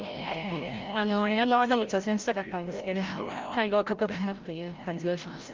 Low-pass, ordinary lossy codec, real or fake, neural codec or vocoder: 7.2 kHz; Opus, 32 kbps; fake; codec, 16 kHz, 0.5 kbps, FreqCodec, larger model